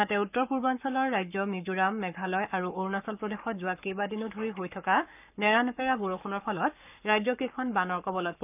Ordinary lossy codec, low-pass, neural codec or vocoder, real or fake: none; 3.6 kHz; codec, 44.1 kHz, 7.8 kbps, Pupu-Codec; fake